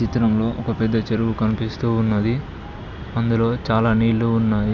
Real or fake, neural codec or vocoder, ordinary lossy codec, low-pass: real; none; none; 7.2 kHz